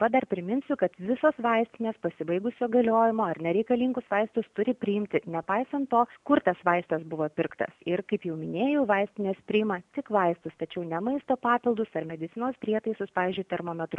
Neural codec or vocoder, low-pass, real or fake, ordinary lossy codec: none; 10.8 kHz; real; MP3, 96 kbps